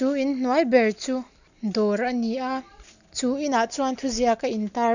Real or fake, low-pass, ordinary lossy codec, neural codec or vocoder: real; 7.2 kHz; none; none